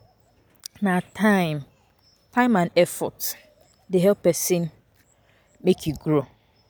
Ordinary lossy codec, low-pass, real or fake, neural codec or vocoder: none; none; real; none